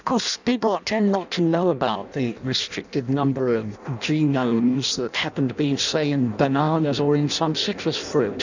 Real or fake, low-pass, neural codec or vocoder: fake; 7.2 kHz; codec, 16 kHz in and 24 kHz out, 0.6 kbps, FireRedTTS-2 codec